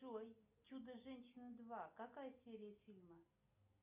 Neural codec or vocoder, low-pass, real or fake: none; 3.6 kHz; real